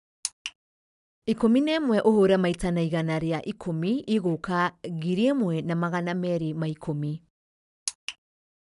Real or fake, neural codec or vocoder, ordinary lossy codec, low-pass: real; none; none; 10.8 kHz